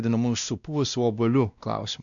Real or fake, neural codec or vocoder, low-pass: fake; codec, 16 kHz, 1 kbps, X-Codec, WavLM features, trained on Multilingual LibriSpeech; 7.2 kHz